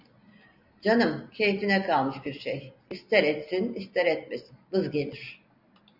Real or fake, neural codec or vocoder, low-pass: real; none; 5.4 kHz